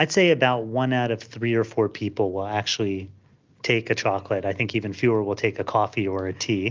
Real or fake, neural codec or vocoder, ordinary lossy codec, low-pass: real; none; Opus, 32 kbps; 7.2 kHz